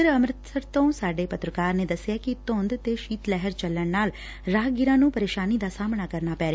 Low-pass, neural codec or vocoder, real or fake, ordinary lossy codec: none; none; real; none